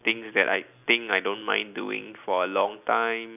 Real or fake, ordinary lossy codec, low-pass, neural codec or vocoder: real; none; 3.6 kHz; none